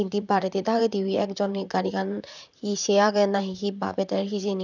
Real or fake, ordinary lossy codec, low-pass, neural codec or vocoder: fake; none; 7.2 kHz; vocoder, 44.1 kHz, 128 mel bands, Pupu-Vocoder